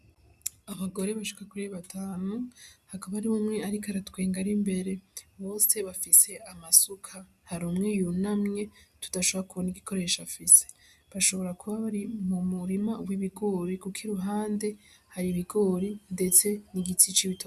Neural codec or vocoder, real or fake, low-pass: none; real; 14.4 kHz